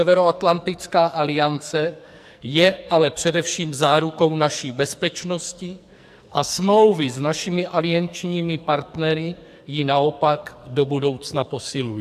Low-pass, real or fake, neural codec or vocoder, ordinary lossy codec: 14.4 kHz; fake; codec, 44.1 kHz, 2.6 kbps, SNAC; AAC, 96 kbps